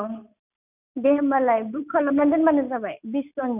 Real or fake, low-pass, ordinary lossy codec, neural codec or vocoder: real; 3.6 kHz; none; none